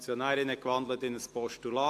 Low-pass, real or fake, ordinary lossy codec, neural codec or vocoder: 14.4 kHz; real; AAC, 64 kbps; none